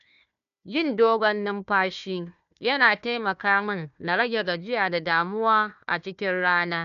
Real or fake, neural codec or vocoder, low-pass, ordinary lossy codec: fake; codec, 16 kHz, 1 kbps, FunCodec, trained on Chinese and English, 50 frames a second; 7.2 kHz; none